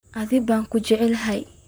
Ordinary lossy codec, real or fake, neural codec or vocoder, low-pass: none; fake; vocoder, 44.1 kHz, 128 mel bands, Pupu-Vocoder; none